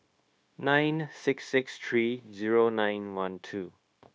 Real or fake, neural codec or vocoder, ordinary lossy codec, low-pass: fake; codec, 16 kHz, 0.9 kbps, LongCat-Audio-Codec; none; none